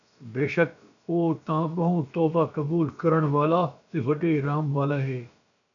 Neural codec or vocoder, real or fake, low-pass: codec, 16 kHz, about 1 kbps, DyCAST, with the encoder's durations; fake; 7.2 kHz